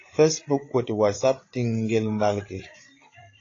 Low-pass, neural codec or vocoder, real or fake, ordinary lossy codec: 7.2 kHz; codec, 16 kHz, 8 kbps, FreqCodec, larger model; fake; AAC, 32 kbps